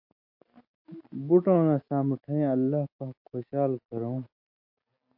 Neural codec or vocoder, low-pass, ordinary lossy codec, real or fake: none; 5.4 kHz; MP3, 32 kbps; real